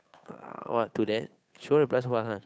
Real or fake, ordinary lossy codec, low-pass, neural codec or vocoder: fake; none; none; codec, 16 kHz, 8 kbps, FunCodec, trained on Chinese and English, 25 frames a second